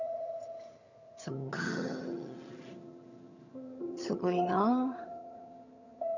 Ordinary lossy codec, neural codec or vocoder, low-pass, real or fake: none; vocoder, 22.05 kHz, 80 mel bands, HiFi-GAN; 7.2 kHz; fake